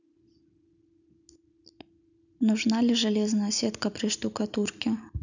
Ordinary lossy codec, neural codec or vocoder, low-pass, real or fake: none; none; 7.2 kHz; real